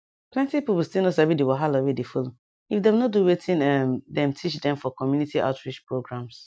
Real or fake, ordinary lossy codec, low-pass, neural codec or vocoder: real; none; none; none